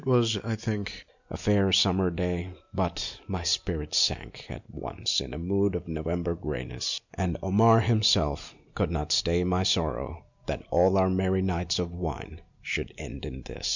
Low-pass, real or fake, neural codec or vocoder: 7.2 kHz; real; none